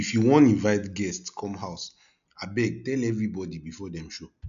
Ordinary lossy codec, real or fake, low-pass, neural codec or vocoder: none; real; 7.2 kHz; none